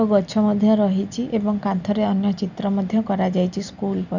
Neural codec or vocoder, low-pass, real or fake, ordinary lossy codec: none; 7.2 kHz; real; none